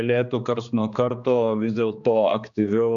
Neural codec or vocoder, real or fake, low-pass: codec, 16 kHz, 2 kbps, X-Codec, HuBERT features, trained on general audio; fake; 7.2 kHz